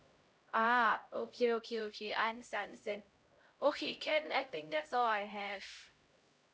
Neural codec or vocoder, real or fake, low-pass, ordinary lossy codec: codec, 16 kHz, 0.5 kbps, X-Codec, HuBERT features, trained on LibriSpeech; fake; none; none